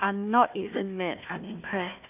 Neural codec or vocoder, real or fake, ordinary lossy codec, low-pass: codec, 16 kHz, 1 kbps, FunCodec, trained on Chinese and English, 50 frames a second; fake; AAC, 32 kbps; 3.6 kHz